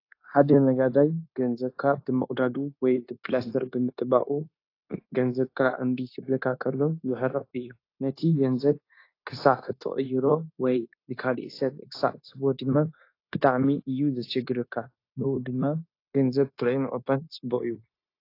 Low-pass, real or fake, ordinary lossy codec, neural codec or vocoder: 5.4 kHz; fake; AAC, 32 kbps; codec, 16 kHz, 0.9 kbps, LongCat-Audio-Codec